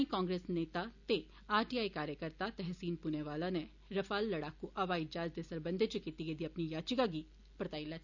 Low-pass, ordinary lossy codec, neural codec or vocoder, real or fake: 7.2 kHz; none; none; real